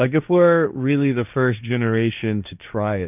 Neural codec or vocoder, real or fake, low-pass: codec, 16 kHz, 1.1 kbps, Voila-Tokenizer; fake; 3.6 kHz